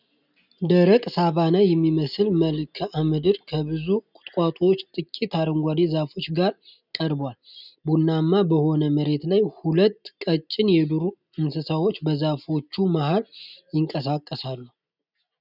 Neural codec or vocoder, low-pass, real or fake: none; 5.4 kHz; real